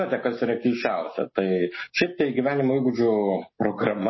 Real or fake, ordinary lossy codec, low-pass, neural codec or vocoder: real; MP3, 24 kbps; 7.2 kHz; none